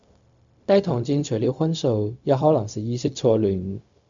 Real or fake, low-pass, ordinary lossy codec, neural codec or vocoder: fake; 7.2 kHz; MP3, 64 kbps; codec, 16 kHz, 0.4 kbps, LongCat-Audio-Codec